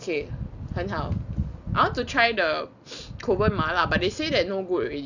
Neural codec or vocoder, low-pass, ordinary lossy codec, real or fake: none; 7.2 kHz; none; real